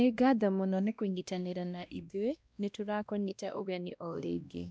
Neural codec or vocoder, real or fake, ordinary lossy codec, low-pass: codec, 16 kHz, 1 kbps, X-Codec, WavLM features, trained on Multilingual LibriSpeech; fake; none; none